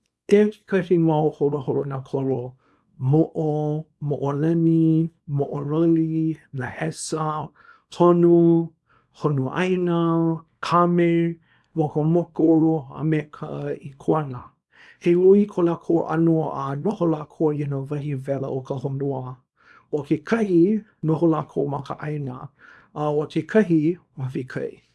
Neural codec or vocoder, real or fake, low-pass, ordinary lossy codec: codec, 24 kHz, 0.9 kbps, WavTokenizer, small release; fake; none; none